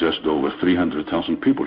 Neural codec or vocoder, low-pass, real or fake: codec, 16 kHz in and 24 kHz out, 1 kbps, XY-Tokenizer; 5.4 kHz; fake